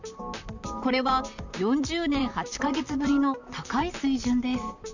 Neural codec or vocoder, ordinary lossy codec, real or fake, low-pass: vocoder, 44.1 kHz, 128 mel bands, Pupu-Vocoder; none; fake; 7.2 kHz